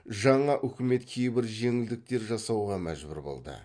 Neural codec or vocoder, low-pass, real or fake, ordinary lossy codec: none; 9.9 kHz; real; MP3, 48 kbps